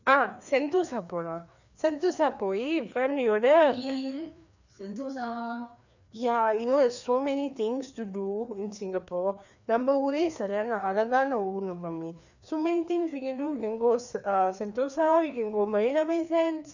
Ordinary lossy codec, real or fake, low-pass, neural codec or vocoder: none; fake; 7.2 kHz; codec, 16 kHz, 2 kbps, FreqCodec, larger model